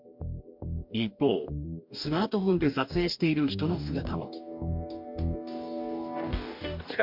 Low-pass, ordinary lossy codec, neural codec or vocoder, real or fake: 5.4 kHz; MP3, 48 kbps; codec, 44.1 kHz, 2.6 kbps, DAC; fake